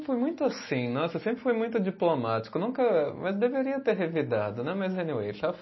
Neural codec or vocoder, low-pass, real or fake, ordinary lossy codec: none; 7.2 kHz; real; MP3, 24 kbps